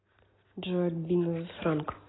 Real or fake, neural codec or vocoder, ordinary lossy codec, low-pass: real; none; AAC, 16 kbps; 7.2 kHz